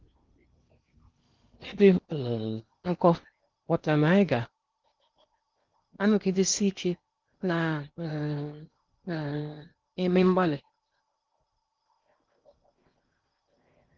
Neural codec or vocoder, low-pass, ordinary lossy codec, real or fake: codec, 16 kHz in and 24 kHz out, 0.8 kbps, FocalCodec, streaming, 65536 codes; 7.2 kHz; Opus, 32 kbps; fake